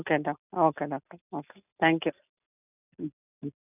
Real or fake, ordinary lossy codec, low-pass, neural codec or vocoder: real; none; 3.6 kHz; none